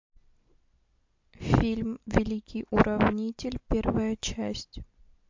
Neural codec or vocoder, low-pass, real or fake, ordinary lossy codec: none; 7.2 kHz; real; MP3, 48 kbps